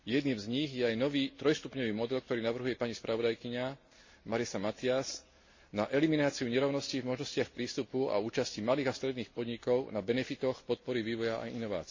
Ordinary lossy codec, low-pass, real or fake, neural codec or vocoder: MP3, 32 kbps; 7.2 kHz; real; none